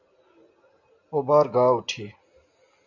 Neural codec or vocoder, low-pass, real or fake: none; 7.2 kHz; real